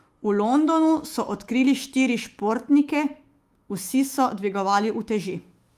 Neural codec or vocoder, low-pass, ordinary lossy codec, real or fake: autoencoder, 48 kHz, 128 numbers a frame, DAC-VAE, trained on Japanese speech; 14.4 kHz; Opus, 32 kbps; fake